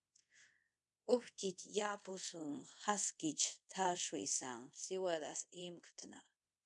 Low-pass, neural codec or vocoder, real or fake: 10.8 kHz; codec, 24 kHz, 0.5 kbps, DualCodec; fake